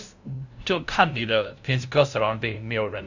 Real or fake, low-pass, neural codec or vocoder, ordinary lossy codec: fake; 7.2 kHz; codec, 16 kHz, 0.5 kbps, FunCodec, trained on LibriTTS, 25 frames a second; none